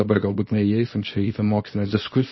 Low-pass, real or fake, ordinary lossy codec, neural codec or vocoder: 7.2 kHz; fake; MP3, 24 kbps; codec, 24 kHz, 0.9 kbps, WavTokenizer, small release